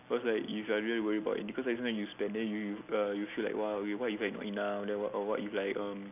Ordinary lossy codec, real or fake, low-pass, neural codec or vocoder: none; real; 3.6 kHz; none